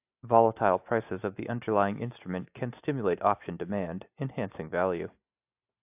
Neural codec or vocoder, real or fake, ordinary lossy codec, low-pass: none; real; AAC, 32 kbps; 3.6 kHz